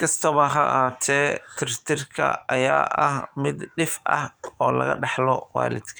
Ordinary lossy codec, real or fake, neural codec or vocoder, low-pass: none; fake; codec, 44.1 kHz, 7.8 kbps, DAC; none